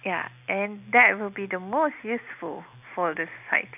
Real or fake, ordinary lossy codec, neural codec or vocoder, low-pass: real; none; none; 3.6 kHz